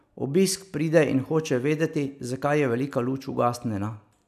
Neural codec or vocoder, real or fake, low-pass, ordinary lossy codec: none; real; 14.4 kHz; none